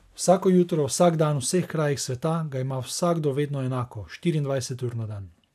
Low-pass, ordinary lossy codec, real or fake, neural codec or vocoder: 14.4 kHz; none; real; none